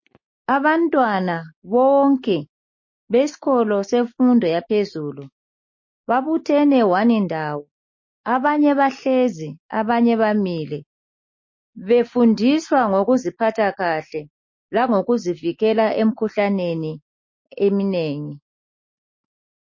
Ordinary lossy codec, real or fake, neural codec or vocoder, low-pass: MP3, 32 kbps; real; none; 7.2 kHz